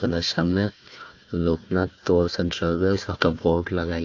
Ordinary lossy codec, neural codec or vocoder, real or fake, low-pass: AAC, 48 kbps; codec, 16 kHz, 1 kbps, FunCodec, trained on Chinese and English, 50 frames a second; fake; 7.2 kHz